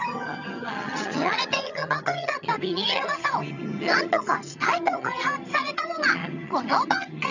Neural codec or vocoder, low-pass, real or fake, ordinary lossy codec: vocoder, 22.05 kHz, 80 mel bands, HiFi-GAN; 7.2 kHz; fake; none